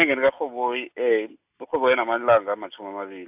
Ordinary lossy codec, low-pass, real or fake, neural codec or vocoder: AAC, 32 kbps; 3.6 kHz; real; none